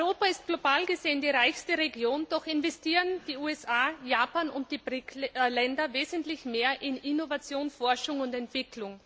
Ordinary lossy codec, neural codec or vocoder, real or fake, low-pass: none; none; real; none